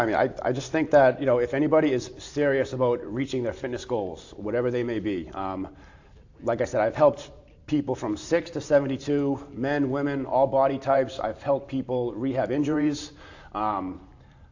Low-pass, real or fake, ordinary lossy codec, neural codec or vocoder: 7.2 kHz; fake; AAC, 48 kbps; vocoder, 44.1 kHz, 128 mel bands every 512 samples, BigVGAN v2